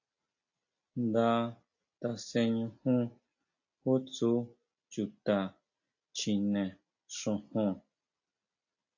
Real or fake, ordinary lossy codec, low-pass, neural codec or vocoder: real; AAC, 48 kbps; 7.2 kHz; none